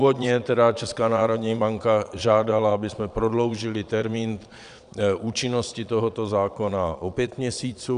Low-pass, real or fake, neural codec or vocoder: 9.9 kHz; fake; vocoder, 22.05 kHz, 80 mel bands, Vocos